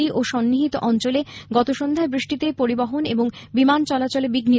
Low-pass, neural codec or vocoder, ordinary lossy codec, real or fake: none; none; none; real